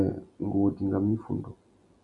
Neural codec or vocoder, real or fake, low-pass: none; real; 10.8 kHz